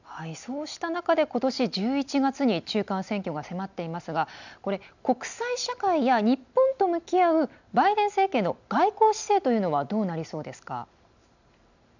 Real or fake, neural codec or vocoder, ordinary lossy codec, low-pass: real; none; none; 7.2 kHz